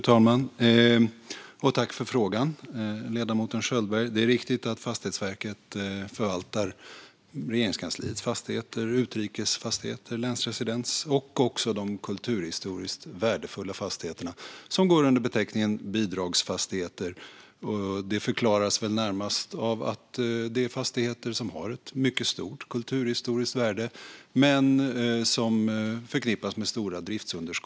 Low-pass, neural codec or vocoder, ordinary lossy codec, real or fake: none; none; none; real